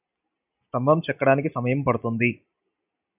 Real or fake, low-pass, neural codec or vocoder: real; 3.6 kHz; none